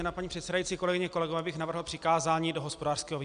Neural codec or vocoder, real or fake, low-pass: none; real; 9.9 kHz